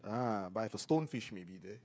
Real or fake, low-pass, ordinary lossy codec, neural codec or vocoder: fake; none; none; codec, 16 kHz, 16 kbps, FreqCodec, smaller model